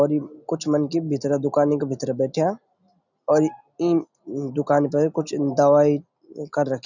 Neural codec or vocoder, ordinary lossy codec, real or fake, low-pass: none; none; real; 7.2 kHz